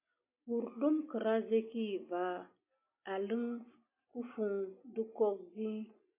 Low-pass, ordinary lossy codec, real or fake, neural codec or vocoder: 3.6 kHz; MP3, 24 kbps; real; none